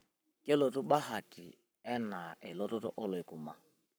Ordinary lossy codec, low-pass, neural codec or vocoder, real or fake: none; none; codec, 44.1 kHz, 7.8 kbps, Pupu-Codec; fake